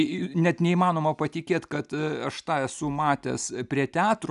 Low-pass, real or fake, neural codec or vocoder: 10.8 kHz; real; none